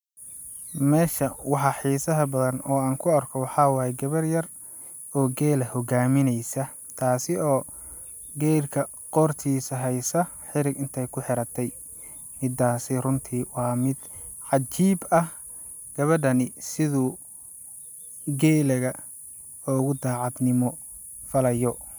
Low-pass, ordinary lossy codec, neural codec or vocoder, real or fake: none; none; none; real